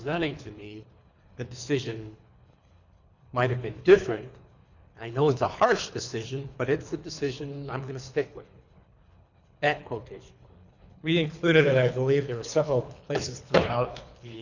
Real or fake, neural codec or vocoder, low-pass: fake; codec, 24 kHz, 3 kbps, HILCodec; 7.2 kHz